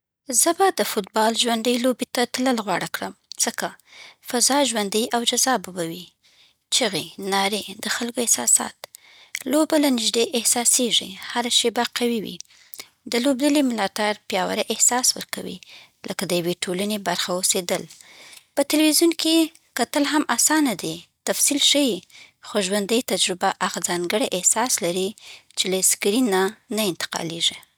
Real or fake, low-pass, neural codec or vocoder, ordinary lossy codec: real; none; none; none